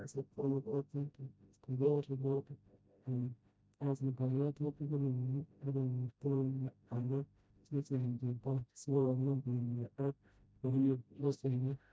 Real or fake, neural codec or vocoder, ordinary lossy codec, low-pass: fake; codec, 16 kHz, 0.5 kbps, FreqCodec, smaller model; none; none